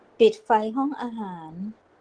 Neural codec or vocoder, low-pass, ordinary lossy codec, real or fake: none; 9.9 kHz; Opus, 16 kbps; real